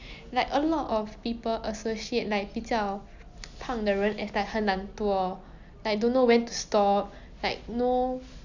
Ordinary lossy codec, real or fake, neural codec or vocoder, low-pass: none; real; none; 7.2 kHz